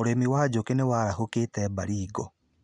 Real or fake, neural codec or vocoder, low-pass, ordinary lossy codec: real; none; 9.9 kHz; none